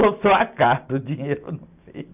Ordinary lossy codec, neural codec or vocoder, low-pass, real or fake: none; none; 3.6 kHz; real